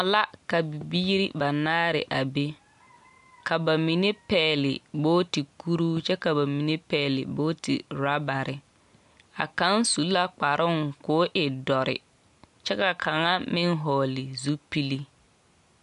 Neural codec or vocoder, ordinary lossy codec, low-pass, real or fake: none; MP3, 64 kbps; 10.8 kHz; real